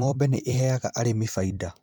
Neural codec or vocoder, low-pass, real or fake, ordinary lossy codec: vocoder, 44.1 kHz, 128 mel bands every 256 samples, BigVGAN v2; 19.8 kHz; fake; MP3, 96 kbps